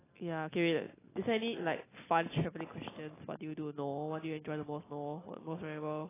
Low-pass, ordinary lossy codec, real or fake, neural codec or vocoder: 3.6 kHz; AAC, 16 kbps; real; none